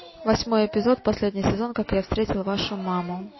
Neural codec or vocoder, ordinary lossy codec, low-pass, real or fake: none; MP3, 24 kbps; 7.2 kHz; real